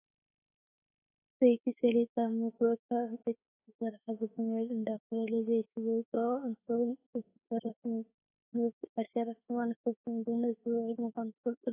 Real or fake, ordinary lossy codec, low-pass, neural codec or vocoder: fake; AAC, 16 kbps; 3.6 kHz; autoencoder, 48 kHz, 32 numbers a frame, DAC-VAE, trained on Japanese speech